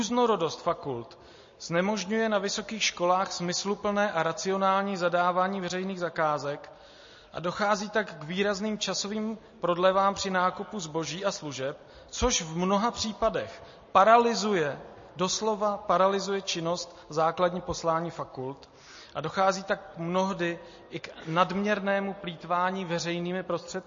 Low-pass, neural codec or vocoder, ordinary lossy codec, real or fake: 7.2 kHz; none; MP3, 32 kbps; real